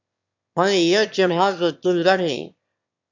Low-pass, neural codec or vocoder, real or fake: 7.2 kHz; autoencoder, 22.05 kHz, a latent of 192 numbers a frame, VITS, trained on one speaker; fake